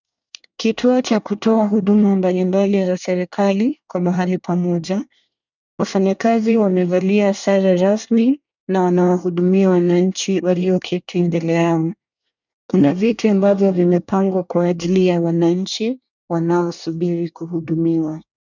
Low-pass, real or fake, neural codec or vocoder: 7.2 kHz; fake; codec, 24 kHz, 1 kbps, SNAC